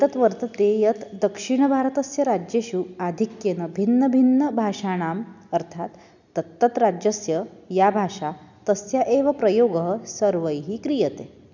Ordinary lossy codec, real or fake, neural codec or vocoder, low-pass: none; real; none; 7.2 kHz